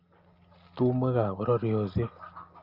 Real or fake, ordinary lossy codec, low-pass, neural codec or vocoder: real; Opus, 24 kbps; 5.4 kHz; none